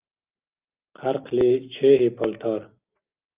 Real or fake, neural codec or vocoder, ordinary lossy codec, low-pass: real; none; Opus, 24 kbps; 3.6 kHz